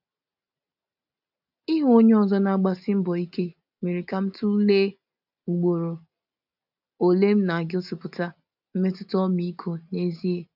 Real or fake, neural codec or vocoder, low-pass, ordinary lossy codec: real; none; 5.4 kHz; none